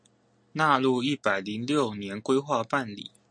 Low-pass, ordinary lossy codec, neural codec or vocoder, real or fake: 9.9 kHz; MP3, 48 kbps; none; real